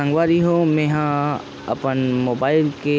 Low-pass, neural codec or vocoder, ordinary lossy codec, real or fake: none; none; none; real